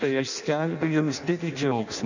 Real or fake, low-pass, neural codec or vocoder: fake; 7.2 kHz; codec, 16 kHz in and 24 kHz out, 0.6 kbps, FireRedTTS-2 codec